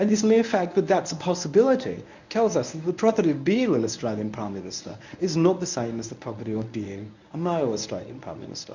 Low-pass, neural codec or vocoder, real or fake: 7.2 kHz; codec, 24 kHz, 0.9 kbps, WavTokenizer, medium speech release version 1; fake